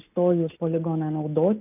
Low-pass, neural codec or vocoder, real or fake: 3.6 kHz; none; real